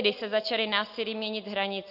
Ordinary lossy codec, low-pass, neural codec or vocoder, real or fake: AAC, 48 kbps; 5.4 kHz; none; real